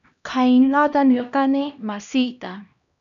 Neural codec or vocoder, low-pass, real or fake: codec, 16 kHz, 1 kbps, X-Codec, HuBERT features, trained on LibriSpeech; 7.2 kHz; fake